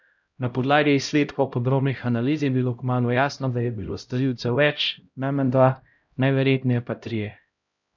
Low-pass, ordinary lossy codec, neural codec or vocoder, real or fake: 7.2 kHz; none; codec, 16 kHz, 0.5 kbps, X-Codec, HuBERT features, trained on LibriSpeech; fake